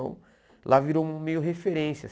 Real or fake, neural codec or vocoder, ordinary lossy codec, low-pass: real; none; none; none